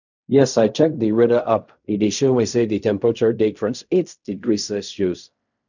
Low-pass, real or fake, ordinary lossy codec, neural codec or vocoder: 7.2 kHz; fake; none; codec, 16 kHz in and 24 kHz out, 0.4 kbps, LongCat-Audio-Codec, fine tuned four codebook decoder